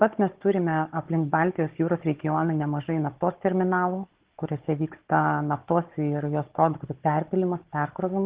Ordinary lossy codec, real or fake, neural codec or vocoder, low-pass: Opus, 16 kbps; fake; codec, 16 kHz, 16 kbps, FunCodec, trained on Chinese and English, 50 frames a second; 3.6 kHz